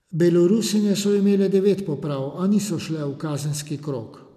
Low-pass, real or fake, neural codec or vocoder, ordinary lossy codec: 14.4 kHz; real; none; none